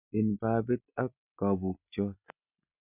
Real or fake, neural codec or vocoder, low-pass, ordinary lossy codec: real; none; 3.6 kHz; none